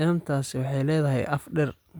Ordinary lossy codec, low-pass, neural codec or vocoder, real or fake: none; none; none; real